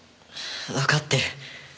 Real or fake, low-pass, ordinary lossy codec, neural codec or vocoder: real; none; none; none